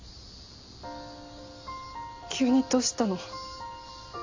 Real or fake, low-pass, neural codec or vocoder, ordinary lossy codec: real; 7.2 kHz; none; AAC, 48 kbps